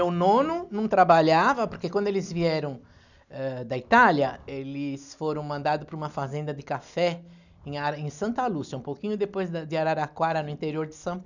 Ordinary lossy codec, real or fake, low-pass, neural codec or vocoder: none; real; 7.2 kHz; none